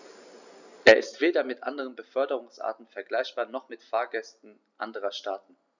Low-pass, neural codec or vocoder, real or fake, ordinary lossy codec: 7.2 kHz; none; real; none